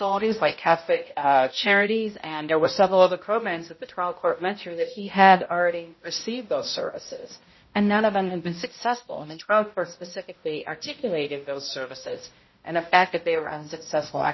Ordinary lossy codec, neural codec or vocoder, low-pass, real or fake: MP3, 24 kbps; codec, 16 kHz, 0.5 kbps, X-Codec, HuBERT features, trained on balanced general audio; 7.2 kHz; fake